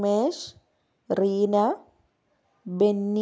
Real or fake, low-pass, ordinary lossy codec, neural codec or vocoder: real; none; none; none